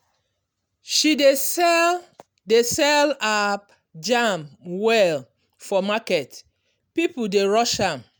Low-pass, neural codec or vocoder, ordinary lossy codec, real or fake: none; none; none; real